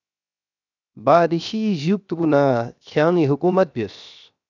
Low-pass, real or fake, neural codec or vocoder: 7.2 kHz; fake; codec, 16 kHz, 0.7 kbps, FocalCodec